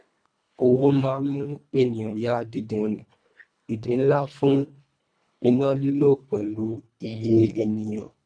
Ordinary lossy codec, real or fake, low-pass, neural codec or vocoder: none; fake; 9.9 kHz; codec, 24 kHz, 1.5 kbps, HILCodec